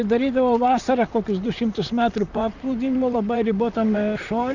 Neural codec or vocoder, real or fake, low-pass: vocoder, 44.1 kHz, 128 mel bands, Pupu-Vocoder; fake; 7.2 kHz